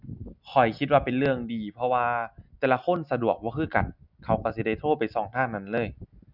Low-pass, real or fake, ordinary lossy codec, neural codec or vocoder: 5.4 kHz; real; none; none